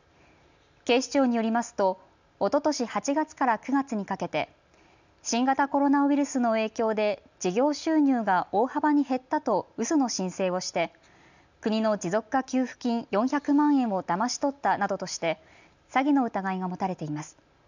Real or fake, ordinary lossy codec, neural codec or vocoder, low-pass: real; none; none; 7.2 kHz